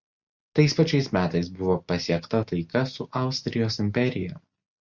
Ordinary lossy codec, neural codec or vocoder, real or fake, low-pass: Opus, 64 kbps; none; real; 7.2 kHz